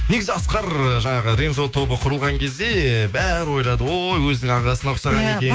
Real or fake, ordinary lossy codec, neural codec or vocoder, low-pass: fake; none; codec, 16 kHz, 6 kbps, DAC; none